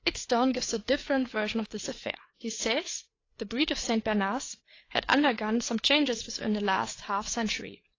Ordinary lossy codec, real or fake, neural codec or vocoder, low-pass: AAC, 32 kbps; fake; codec, 16 kHz, 4 kbps, FreqCodec, larger model; 7.2 kHz